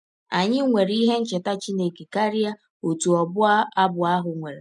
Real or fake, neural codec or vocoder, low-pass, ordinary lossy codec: real; none; 10.8 kHz; none